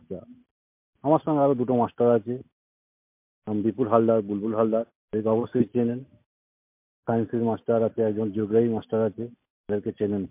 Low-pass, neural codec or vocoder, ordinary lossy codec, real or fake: 3.6 kHz; none; MP3, 32 kbps; real